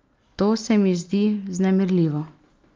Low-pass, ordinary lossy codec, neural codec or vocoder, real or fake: 7.2 kHz; Opus, 32 kbps; none; real